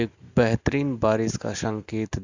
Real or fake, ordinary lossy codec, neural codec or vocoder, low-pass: real; Opus, 64 kbps; none; 7.2 kHz